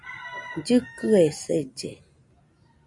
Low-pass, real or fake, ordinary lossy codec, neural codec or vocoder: 10.8 kHz; real; MP3, 64 kbps; none